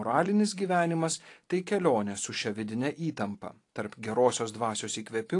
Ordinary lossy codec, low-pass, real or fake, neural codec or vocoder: AAC, 48 kbps; 10.8 kHz; fake; vocoder, 44.1 kHz, 128 mel bands every 256 samples, BigVGAN v2